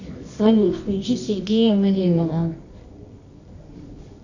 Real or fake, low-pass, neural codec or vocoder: fake; 7.2 kHz; codec, 24 kHz, 0.9 kbps, WavTokenizer, medium music audio release